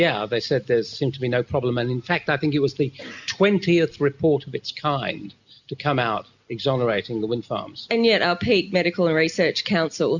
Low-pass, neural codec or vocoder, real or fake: 7.2 kHz; none; real